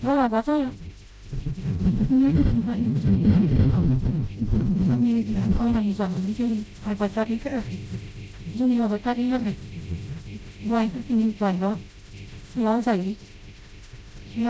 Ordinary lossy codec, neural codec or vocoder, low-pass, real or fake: none; codec, 16 kHz, 0.5 kbps, FreqCodec, smaller model; none; fake